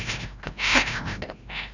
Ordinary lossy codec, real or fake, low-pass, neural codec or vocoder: none; fake; 7.2 kHz; codec, 16 kHz, 0.5 kbps, FreqCodec, larger model